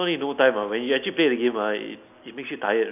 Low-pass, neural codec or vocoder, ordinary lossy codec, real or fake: 3.6 kHz; none; none; real